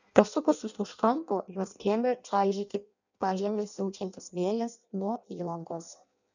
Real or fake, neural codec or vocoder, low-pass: fake; codec, 16 kHz in and 24 kHz out, 0.6 kbps, FireRedTTS-2 codec; 7.2 kHz